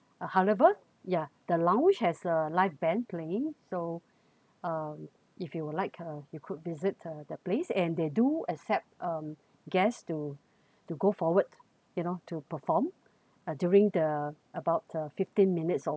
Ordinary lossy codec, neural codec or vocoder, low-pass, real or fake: none; none; none; real